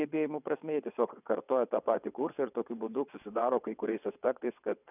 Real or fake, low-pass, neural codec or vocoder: fake; 3.6 kHz; vocoder, 22.05 kHz, 80 mel bands, WaveNeXt